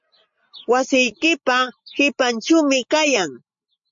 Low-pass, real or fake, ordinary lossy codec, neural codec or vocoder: 7.2 kHz; real; MP3, 48 kbps; none